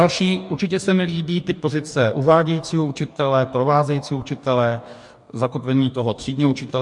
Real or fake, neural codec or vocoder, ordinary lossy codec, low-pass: fake; codec, 44.1 kHz, 2.6 kbps, DAC; MP3, 64 kbps; 10.8 kHz